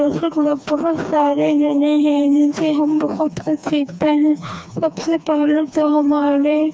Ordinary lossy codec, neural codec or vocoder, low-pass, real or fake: none; codec, 16 kHz, 2 kbps, FreqCodec, smaller model; none; fake